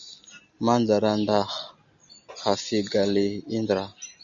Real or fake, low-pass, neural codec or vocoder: real; 7.2 kHz; none